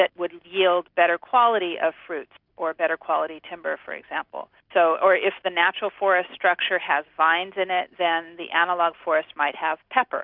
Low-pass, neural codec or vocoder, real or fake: 5.4 kHz; none; real